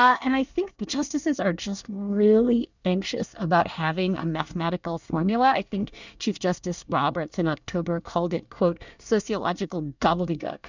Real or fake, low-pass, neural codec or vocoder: fake; 7.2 kHz; codec, 24 kHz, 1 kbps, SNAC